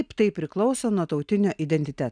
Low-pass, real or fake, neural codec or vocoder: 9.9 kHz; real; none